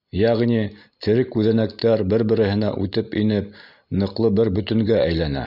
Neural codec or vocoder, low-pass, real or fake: none; 5.4 kHz; real